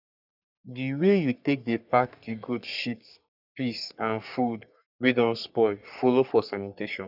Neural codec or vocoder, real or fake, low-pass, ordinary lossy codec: codec, 44.1 kHz, 3.4 kbps, Pupu-Codec; fake; 5.4 kHz; none